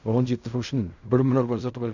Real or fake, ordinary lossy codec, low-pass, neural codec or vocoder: fake; none; 7.2 kHz; codec, 16 kHz in and 24 kHz out, 0.4 kbps, LongCat-Audio-Codec, fine tuned four codebook decoder